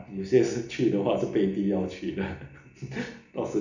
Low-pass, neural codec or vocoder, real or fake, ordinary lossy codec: 7.2 kHz; none; real; none